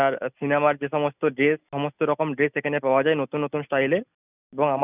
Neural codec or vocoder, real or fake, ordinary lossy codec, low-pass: none; real; none; 3.6 kHz